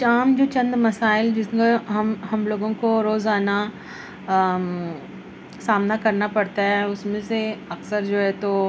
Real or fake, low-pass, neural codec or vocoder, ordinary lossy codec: real; none; none; none